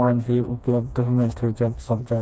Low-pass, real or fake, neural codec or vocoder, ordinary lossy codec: none; fake; codec, 16 kHz, 2 kbps, FreqCodec, smaller model; none